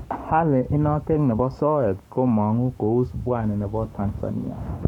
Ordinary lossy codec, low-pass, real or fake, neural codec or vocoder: none; 19.8 kHz; fake; codec, 44.1 kHz, 7.8 kbps, Pupu-Codec